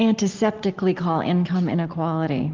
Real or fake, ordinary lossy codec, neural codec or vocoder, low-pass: real; Opus, 16 kbps; none; 7.2 kHz